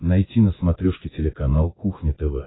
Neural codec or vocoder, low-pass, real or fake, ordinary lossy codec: vocoder, 24 kHz, 100 mel bands, Vocos; 7.2 kHz; fake; AAC, 16 kbps